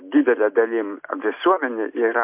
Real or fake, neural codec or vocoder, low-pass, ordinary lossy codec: real; none; 3.6 kHz; MP3, 32 kbps